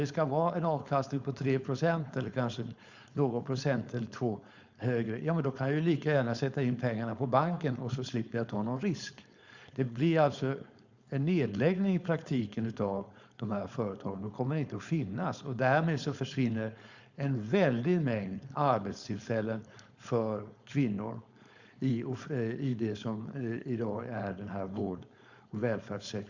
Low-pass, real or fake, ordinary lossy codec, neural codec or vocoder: 7.2 kHz; fake; Opus, 64 kbps; codec, 16 kHz, 4.8 kbps, FACodec